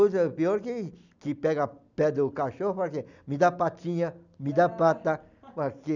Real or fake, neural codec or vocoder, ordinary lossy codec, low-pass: real; none; none; 7.2 kHz